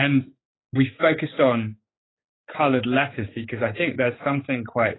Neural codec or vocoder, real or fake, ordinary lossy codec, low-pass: codec, 16 kHz, 4 kbps, X-Codec, HuBERT features, trained on general audio; fake; AAC, 16 kbps; 7.2 kHz